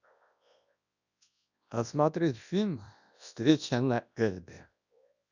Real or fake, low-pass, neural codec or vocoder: fake; 7.2 kHz; codec, 24 kHz, 0.9 kbps, WavTokenizer, large speech release